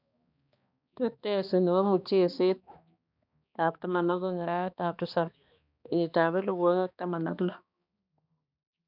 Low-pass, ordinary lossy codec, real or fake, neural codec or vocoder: 5.4 kHz; none; fake; codec, 16 kHz, 2 kbps, X-Codec, HuBERT features, trained on balanced general audio